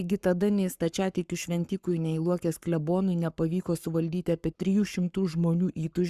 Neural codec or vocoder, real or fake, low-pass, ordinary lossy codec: codec, 44.1 kHz, 7.8 kbps, Pupu-Codec; fake; 14.4 kHz; Opus, 64 kbps